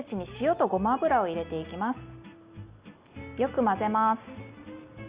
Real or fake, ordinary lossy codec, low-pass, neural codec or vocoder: real; Opus, 64 kbps; 3.6 kHz; none